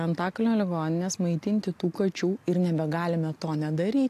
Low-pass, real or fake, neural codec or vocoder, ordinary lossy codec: 14.4 kHz; real; none; AAC, 64 kbps